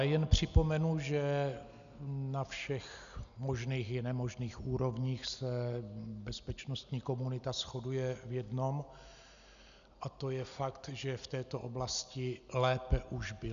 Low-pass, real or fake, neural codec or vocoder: 7.2 kHz; real; none